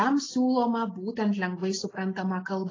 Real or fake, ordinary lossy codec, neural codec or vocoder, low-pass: real; AAC, 32 kbps; none; 7.2 kHz